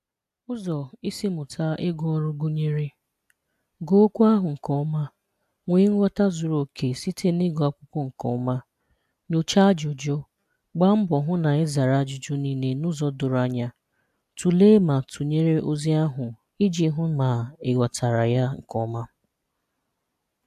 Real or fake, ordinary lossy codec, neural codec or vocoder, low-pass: real; AAC, 96 kbps; none; 14.4 kHz